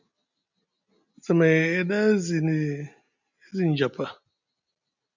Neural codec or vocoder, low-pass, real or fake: none; 7.2 kHz; real